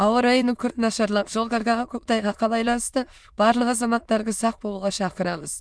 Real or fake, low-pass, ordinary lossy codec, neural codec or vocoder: fake; none; none; autoencoder, 22.05 kHz, a latent of 192 numbers a frame, VITS, trained on many speakers